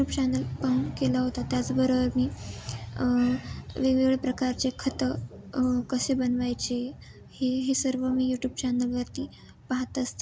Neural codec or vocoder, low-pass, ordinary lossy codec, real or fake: none; none; none; real